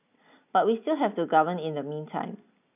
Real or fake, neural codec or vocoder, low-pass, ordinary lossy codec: real; none; 3.6 kHz; none